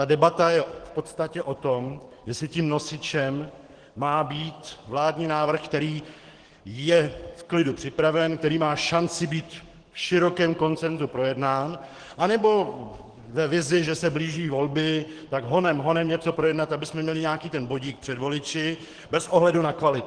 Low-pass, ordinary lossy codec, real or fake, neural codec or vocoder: 9.9 kHz; Opus, 16 kbps; fake; codec, 44.1 kHz, 7.8 kbps, DAC